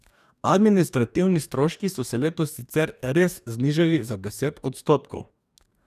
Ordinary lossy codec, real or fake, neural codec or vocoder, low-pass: none; fake; codec, 44.1 kHz, 2.6 kbps, DAC; 14.4 kHz